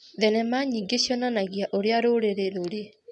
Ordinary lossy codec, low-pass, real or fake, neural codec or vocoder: none; none; real; none